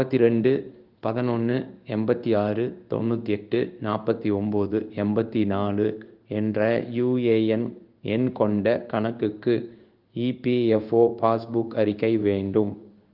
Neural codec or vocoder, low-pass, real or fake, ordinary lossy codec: codec, 16 kHz in and 24 kHz out, 1 kbps, XY-Tokenizer; 5.4 kHz; fake; Opus, 32 kbps